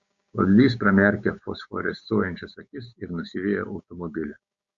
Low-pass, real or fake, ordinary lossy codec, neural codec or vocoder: 7.2 kHz; fake; Opus, 64 kbps; codec, 16 kHz, 6 kbps, DAC